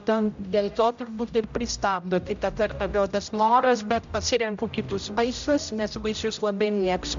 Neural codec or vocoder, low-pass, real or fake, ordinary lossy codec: codec, 16 kHz, 0.5 kbps, X-Codec, HuBERT features, trained on general audio; 7.2 kHz; fake; MP3, 64 kbps